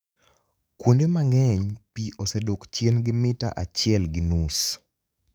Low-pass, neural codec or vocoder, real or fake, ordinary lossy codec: none; codec, 44.1 kHz, 7.8 kbps, DAC; fake; none